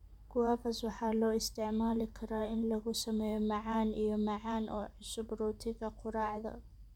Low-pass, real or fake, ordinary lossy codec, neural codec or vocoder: 19.8 kHz; fake; none; vocoder, 44.1 kHz, 128 mel bands every 512 samples, BigVGAN v2